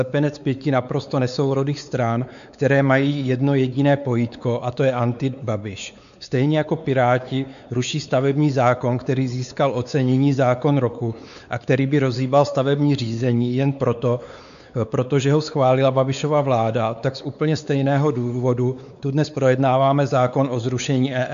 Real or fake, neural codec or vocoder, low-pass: fake; codec, 16 kHz, 4 kbps, X-Codec, WavLM features, trained on Multilingual LibriSpeech; 7.2 kHz